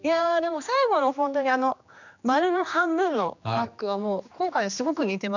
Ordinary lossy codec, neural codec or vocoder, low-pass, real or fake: none; codec, 16 kHz, 2 kbps, X-Codec, HuBERT features, trained on general audio; 7.2 kHz; fake